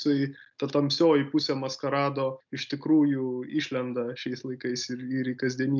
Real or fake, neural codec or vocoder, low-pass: real; none; 7.2 kHz